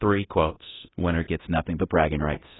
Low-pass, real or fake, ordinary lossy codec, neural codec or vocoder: 7.2 kHz; fake; AAC, 16 kbps; codec, 24 kHz, 0.9 kbps, DualCodec